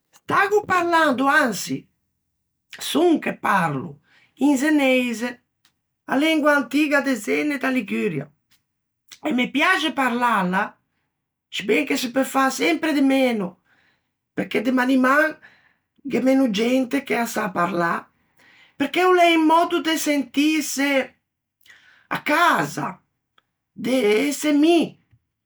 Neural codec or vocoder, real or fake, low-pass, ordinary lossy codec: none; real; none; none